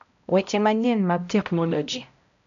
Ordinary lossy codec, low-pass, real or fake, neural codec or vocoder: none; 7.2 kHz; fake; codec, 16 kHz, 0.5 kbps, X-Codec, HuBERT features, trained on balanced general audio